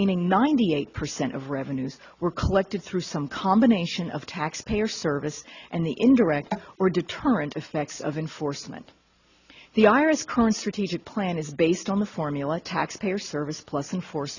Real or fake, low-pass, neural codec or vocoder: real; 7.2 kHz; none